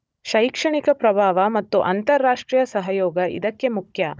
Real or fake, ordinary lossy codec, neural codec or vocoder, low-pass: fake; none; codec, 16 kHz, 16 kbps, FunCodec, trained on Chinese and English, 50 frames a second; none